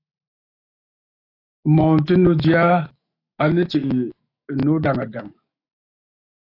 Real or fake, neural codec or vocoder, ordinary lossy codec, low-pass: fake; autoencoder, 48 kHz, 128 numbers a frame, DAC-VAE, trained on Japanese speech; AAC, 32 kbps; 5.4 kHz